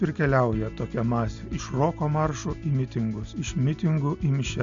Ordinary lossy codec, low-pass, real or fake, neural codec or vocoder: MP3, 64 kbps; 7.2 kHz; real; none